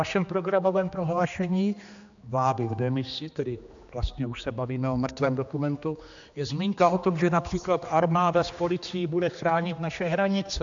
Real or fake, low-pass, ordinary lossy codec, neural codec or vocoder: fake; 7.2 kHz; AAC, 64 kbps; codec, 16 kHz, 2 kbps, X-Codec, HuBERT features, trained on general audio